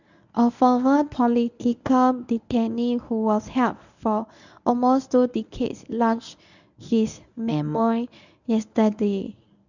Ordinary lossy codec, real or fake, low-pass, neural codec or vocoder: none; fake; 7.2 kHz; codec, 24 kHz, 0.9 kbps, WavTokenizer, medium speech release version 1